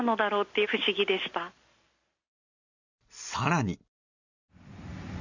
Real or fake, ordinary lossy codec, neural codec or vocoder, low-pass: real; Opus, 64 kbps; none; 7.2 kHz